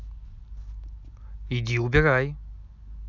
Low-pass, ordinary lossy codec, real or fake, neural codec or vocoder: 7.2 kHz; none; real; none